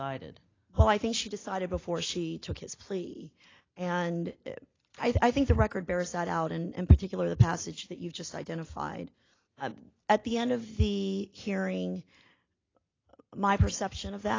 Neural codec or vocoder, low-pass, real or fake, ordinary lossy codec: none; 7.2 kHz; real; AAC, 32 kbps